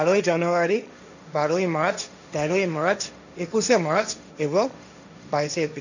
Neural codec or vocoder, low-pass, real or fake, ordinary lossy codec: codec, 16 kHz, 1.1 kbps, Voila-Tokenizer; 7.2 kHz; fake; none